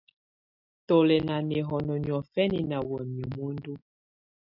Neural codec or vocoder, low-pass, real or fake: none; 5.4 kHz; real